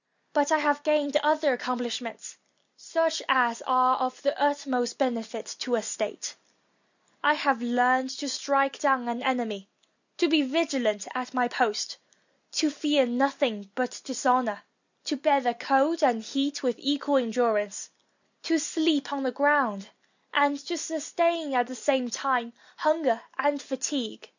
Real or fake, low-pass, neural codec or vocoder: real; 7.2 kHz; none